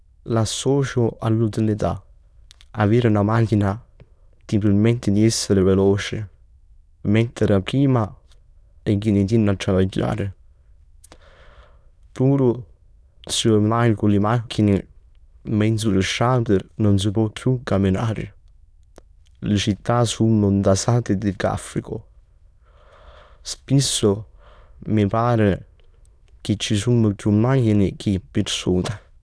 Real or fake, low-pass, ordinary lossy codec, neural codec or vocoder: fake; none; none; autoencoder, 22.05 kHz, a latent of 192 numbers a frame, VITS, trained on many speakers